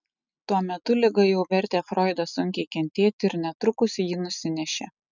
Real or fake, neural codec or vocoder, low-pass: real; none; 7.2 kHz